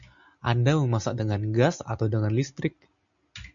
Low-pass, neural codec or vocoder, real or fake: 7.2 kHz; none; real